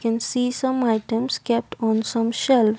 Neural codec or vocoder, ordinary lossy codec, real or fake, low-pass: none; none; real; none